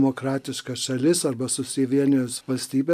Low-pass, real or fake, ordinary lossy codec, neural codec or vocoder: 14.4 kHz; real; AAC, 96 kbps; none